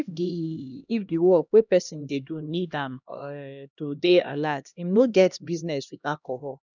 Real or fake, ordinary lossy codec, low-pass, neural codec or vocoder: fake; none; 7.2 kHz; codec, 16 kHz, 1 kbps, X-Codec, HuBERT features, trained on LibriSpeech